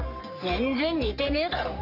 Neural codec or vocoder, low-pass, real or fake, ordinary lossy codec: codec, 44.1 kHz, 3.4 kbps, Pupu-Codec; 5.4 kHz; fake; AAC, 32 kbps